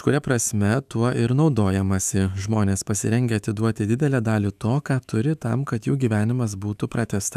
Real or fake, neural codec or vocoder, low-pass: fake; autoencoder, 48 kHz, 128 numbers a frame, DAC-VAE, trained on Japanese speech; 14.4 kHz